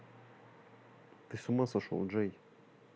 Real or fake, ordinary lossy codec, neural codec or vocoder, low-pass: real; none; none; none